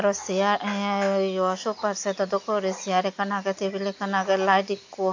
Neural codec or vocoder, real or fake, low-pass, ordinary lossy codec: none; real; 7.2 kHz; AAC, 48 kbps